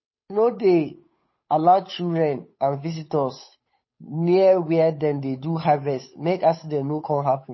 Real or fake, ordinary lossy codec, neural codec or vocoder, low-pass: fake; MP3, 24 kbps; codec, 16 kHz, 8 kbps, FunCodec, trained on Chinese and English, 25 frames a second; 7.2 kHz